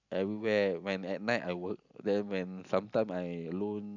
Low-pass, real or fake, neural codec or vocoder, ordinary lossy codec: 7.2 kHz; real; none; none